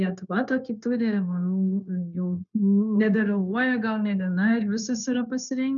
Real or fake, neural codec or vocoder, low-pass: fake; codec, 16 kHz, 0.9 kbps, LongCat-Audio-Codec; 7.2 kHz